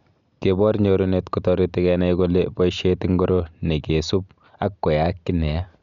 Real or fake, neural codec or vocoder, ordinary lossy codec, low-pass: real; none; none; 7.2 kHz